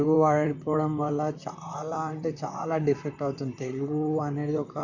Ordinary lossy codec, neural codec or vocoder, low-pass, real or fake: none; vocoder, 44.1 kHz, 128 mel bands, Pupu-Vocoder; 7.2 kHz; fake